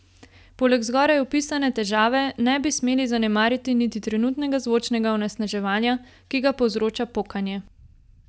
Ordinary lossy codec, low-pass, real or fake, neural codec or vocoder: none; none; real; none